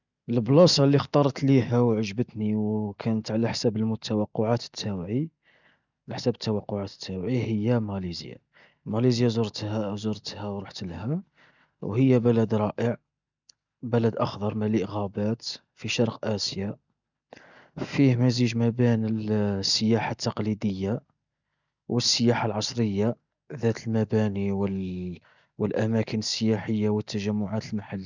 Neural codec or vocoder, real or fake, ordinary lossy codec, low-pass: none; real; none; 7.2 kHz